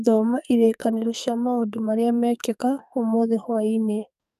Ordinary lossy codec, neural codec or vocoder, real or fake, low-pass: none; codec, 44.1 kHz, 2.6 kbps, SNAC; fake; 14.4 kHz